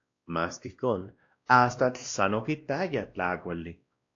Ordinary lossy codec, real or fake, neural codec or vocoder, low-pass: AAC, 48 kbps; fake; codec, 16 kHz, 1 kbps, X-Codec, WavLM features, trained on Multilingual LibriSpeech; 7.2 kHz